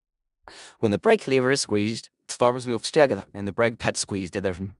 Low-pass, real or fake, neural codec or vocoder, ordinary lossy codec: 10.8 kHz; fake; codec, 16 kHz in and 24 kHz out, 0.4 kbps, LongCat-Audio-Codec, four codebook decoder; none